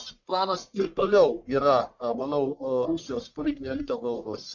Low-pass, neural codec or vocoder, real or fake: 7.2 kHz; codec, 44.1 kHz, 1.7 kbps, Pupu-Codec; fake